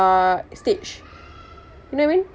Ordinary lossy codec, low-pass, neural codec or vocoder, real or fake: none; none; none; real